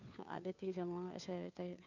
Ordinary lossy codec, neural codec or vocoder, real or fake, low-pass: none; codec, 16 kHz, 2 kbps, FunCodec, trained on Chinese and English, 25 frames a second; fake; 7.2 kHz